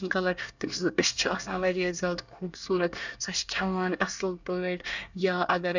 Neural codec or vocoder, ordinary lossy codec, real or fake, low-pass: codec, 24 kHz, 1 kbps, SNAC; none; fake; 7.2 kHz